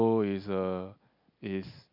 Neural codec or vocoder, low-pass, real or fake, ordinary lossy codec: none; 5.4 kHz; real; none